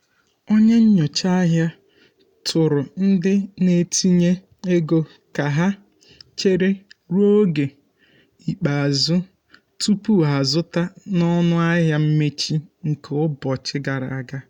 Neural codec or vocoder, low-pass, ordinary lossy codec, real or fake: none; 19.8 kHz; none; real